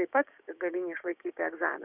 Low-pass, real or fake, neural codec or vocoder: 3.6 kHz; fake; codec, 16 kHz, 6 kbps, DAC